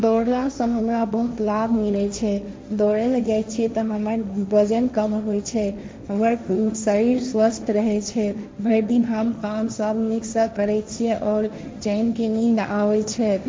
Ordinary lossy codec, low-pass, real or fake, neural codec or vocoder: none; none; fake; codec, 16 kHz, 1.1 kbps, Voila-Tokenizer